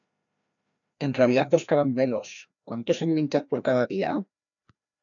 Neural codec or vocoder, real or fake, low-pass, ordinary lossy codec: codec, 16 kHz, 1 kbps, FreqCodec, larger model; fake; 7.2 kHz; MP3, 96 kbps